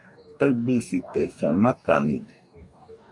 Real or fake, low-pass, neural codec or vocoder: fake; 10.8 kHz; codec, 44.1 kHz, 2.6 kbps, DAC